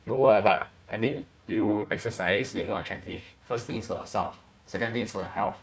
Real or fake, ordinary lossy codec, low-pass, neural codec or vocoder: fake; none; none; codec, 16 kHz, 1 kbps, FunCodec, trained on Chinese and English, 50 frames a second